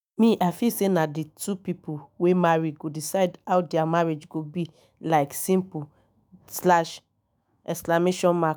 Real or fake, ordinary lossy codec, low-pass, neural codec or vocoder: fake; none; none; autoencoder, 48 kHz, 128 numbers a frame, DAC-VAE, trained on Japanese speech